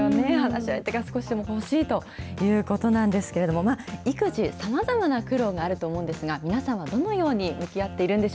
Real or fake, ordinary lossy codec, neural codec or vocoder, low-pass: real; none; none; none